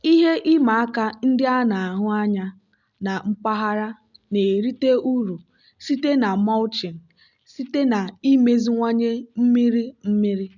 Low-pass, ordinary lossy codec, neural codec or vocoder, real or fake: 7.2 kHz; none; none; real